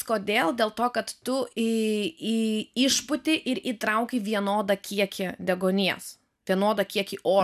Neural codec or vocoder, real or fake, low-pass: none; real; 14.4 kHz